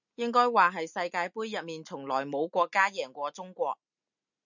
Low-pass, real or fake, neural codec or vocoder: 7.2 kHz; real; none